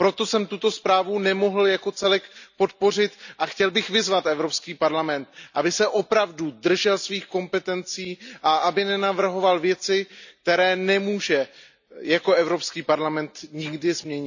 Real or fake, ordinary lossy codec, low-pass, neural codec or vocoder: real; none; 7.2 kHz; none